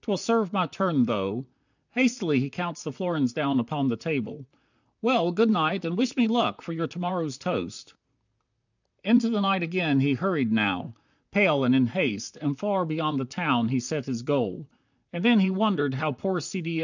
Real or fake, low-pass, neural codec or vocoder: fake; 7.2 kHz; vocoder, 44.1 kHz, 128 mel bands, Pupu-Vocoder